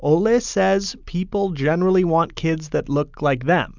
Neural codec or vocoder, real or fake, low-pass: none; real; 7.2 kHz